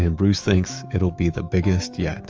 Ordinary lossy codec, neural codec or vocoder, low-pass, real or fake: Opus, 24 kbps; autoencoder, 48 kHz, 128 numbers a frame, DAC-VAE, trained on Japanese speech; 7.2 kHz; fake